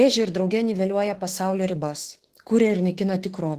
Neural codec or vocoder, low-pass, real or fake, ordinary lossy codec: autoencoder, 48 kHz, 32 numbers a frame, DAC-VAE, trained on Japanese speech; 14.4 kHz; fake; Opus, 16 kbps